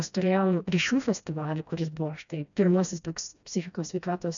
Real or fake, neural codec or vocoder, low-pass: fake; codec, 16 kHz, 1 kbps, FreqCodec, smaller model; 7.2 kHz